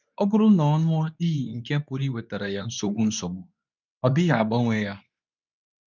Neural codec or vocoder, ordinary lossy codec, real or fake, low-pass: codec, 24 kHz, 0.9 kbps, WavTokenizer, medium speech release version 2; none; fake; 7.2 kHz